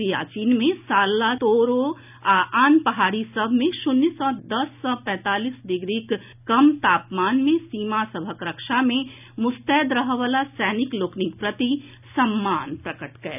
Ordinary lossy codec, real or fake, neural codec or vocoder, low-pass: none; real; none; 3.6 kHz